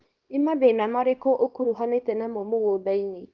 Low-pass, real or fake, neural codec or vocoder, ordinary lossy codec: 7.2 kHz; fake; codec, 24 kHz, 0.9 kbps, WavTokenizer, small release; Opus, 24 kbps